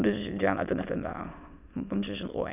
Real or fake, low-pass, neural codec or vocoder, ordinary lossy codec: fake; 3.6 kHz; autoencoder, 22.05 kHz, a latent of 192 numbers a frame, VITS, trained on many speakers; none